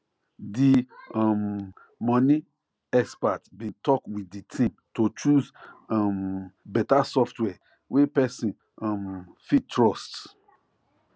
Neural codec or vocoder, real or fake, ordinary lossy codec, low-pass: none; real; none; none